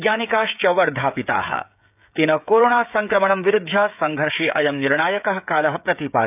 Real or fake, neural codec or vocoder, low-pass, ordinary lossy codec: fake; codec, 16 kHz, 16 kbps, FreqCodec, smaller model; 3.6 kHz; none